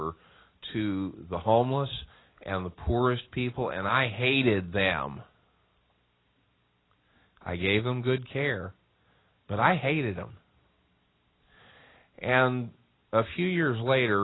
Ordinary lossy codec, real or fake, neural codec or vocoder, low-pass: AAC, 16 kbps; real; none; 7.2 kHz